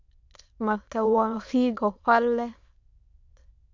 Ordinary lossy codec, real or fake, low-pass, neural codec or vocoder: MP3, 64 kbps; fake; 7.2 kHz; autoencoder, 22.05 kHz, a latent of 192 numbers a frame, VITS, trained on many speakers